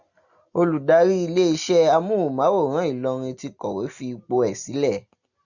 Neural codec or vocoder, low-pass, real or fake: none; 7.2 kHz; real